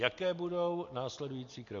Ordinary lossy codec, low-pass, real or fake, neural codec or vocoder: MP3, 48 kbps; 7.2 kHz; real; none